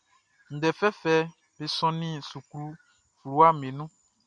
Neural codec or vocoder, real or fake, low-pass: none; real; 9.9 kHz